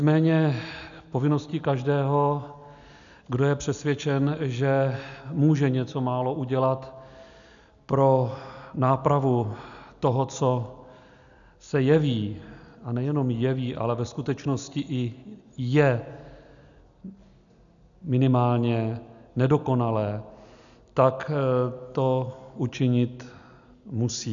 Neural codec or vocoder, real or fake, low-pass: none; real; 7.2 kHz